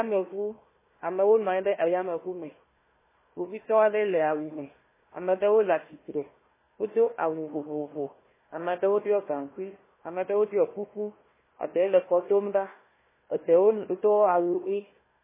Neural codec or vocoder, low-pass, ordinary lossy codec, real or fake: codec, 16 kHz, 1 kbps, FunCodec, trained on Chinese and English, 50 frames a second; 3.6 kHz; MP3, 16 kbps; fake